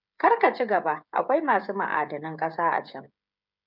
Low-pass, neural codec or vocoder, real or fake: 5.4 kHz; codec, 16 kHz, 16 kbps, FreqCodec, smaller model; fake